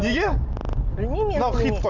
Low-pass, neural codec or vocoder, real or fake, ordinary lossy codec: 7.2 kHz; none; real; none